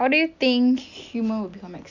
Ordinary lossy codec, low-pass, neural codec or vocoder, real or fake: none; 7.2 kHz; none; real